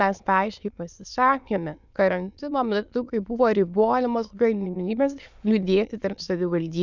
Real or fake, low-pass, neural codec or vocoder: fake; 7.2 kHz; autoencoder, 22.05 kHz, a latent of 192 numbers a frame, VITS, trained on many speakers